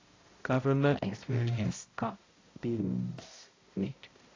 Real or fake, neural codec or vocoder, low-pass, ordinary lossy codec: fake; codec, 16 kHz, 0.5 kbps, X-Codec, HuBERT features, trained on balanced general audio; 7.2 kHz; AAC, 32 kbps